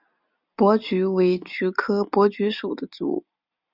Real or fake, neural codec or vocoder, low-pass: real; none; 5.4 kHz